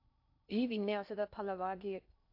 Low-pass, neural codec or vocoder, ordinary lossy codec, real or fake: 5.4 kHz; codec, 16 kHz in and 24 kHz out, 0.6 kbps, FocalCodec, streaming, 2048 codes; AAC, 48 kbps; fake